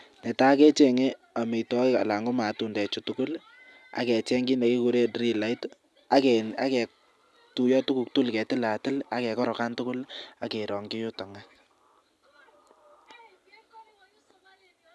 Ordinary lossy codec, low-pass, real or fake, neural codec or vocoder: none; none; real; none